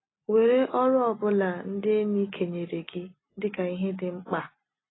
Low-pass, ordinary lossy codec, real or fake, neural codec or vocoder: 7.2 kHz; AAC, 16 kbps; real; none